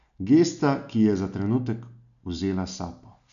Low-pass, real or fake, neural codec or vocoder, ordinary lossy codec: 7.2 kHz; real; none; none